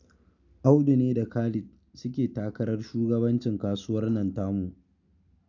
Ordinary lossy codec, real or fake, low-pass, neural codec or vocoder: none; real; 7.2 kHz; none